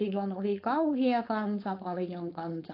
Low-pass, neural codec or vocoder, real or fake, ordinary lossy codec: 5.4 kHz; codec, 16 kHz, 4.8 kbps, FACodec; fake; AAC, 32 kbps